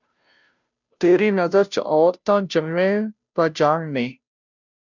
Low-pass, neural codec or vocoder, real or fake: 7.2 kHz; codec, 16 kHz, 0.5 kbps, FunCodec, trained on Chinese and English, 25 frames a second; fake